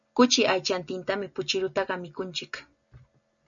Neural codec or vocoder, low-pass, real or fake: none; 7.2 kHz; real